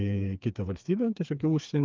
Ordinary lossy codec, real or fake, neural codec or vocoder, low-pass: Opus, 32 kbps; fake; codec, 16 kHz, 4 kbps, FreqCodec, smaller model; 7.2 kHz